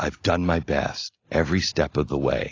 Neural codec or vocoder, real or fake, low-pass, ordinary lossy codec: none; real; 7.2 kHz; AAC, 32 kbps